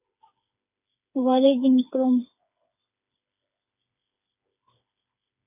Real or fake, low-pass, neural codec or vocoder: fake; 3.6 kHz; codec, 16 kHz, 4 kbps, FreqCodec, smaller model